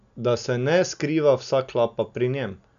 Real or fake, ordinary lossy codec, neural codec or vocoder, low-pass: real; none; none; 7.2 kHz